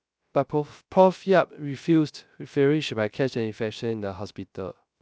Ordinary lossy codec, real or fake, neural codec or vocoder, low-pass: none; fake; codec, 16 kHz, 0.3 kbps, FocalCodec; none